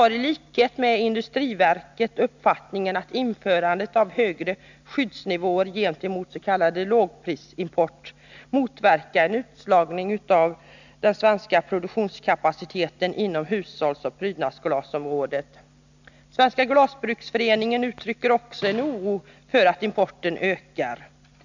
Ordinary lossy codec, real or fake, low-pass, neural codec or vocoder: none; real; 7.2 kHz; none